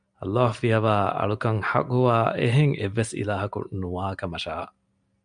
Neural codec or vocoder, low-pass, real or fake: none; 9.9 kHz; real